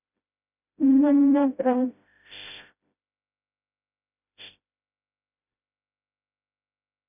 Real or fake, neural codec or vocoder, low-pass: fake; codec, 16 kHz, 0.5 kbps, FreqCodec, smaller model; 3.6 kHz